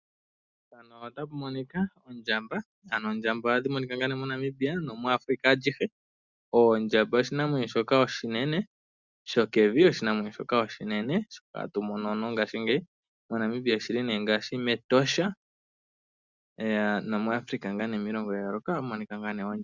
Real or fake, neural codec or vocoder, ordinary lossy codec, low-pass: real; none; Opus, 64 kbps; 7.2 kHz